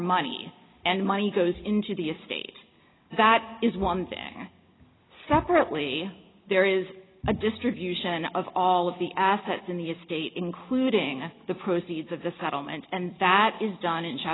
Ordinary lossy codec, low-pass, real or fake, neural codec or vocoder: AAC, 16 kbps; 7.2 kHz; real; none